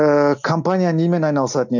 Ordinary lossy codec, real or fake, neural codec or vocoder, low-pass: none; real; none; 7.2 kHz